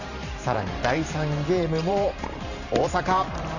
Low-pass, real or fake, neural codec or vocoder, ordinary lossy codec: 7.2 kHz; fake; vocoder, 44.1 kHz, 128 mel bands every 512 samples, BigVGAN v2; none